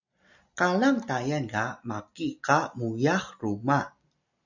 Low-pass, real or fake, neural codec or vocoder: 7.2 kHz; real; none